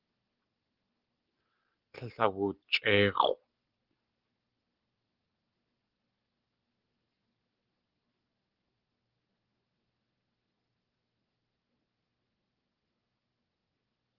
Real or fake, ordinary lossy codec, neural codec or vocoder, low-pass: real; Opus, 16 kbps; none; 5.4 kHz